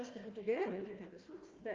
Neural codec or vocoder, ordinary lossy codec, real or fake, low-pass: codec, 16 kHz, 1 kbps, FunCodec, trained on Chinese and English, 50 frames a second; Opus, 24 kbps; fake; 7.2 kHz